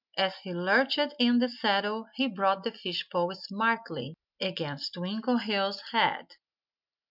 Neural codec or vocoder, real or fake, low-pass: none; real; 5.4 kHz